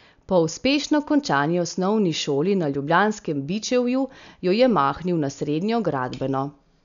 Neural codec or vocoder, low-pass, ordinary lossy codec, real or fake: none; 7.2 kHz; none; real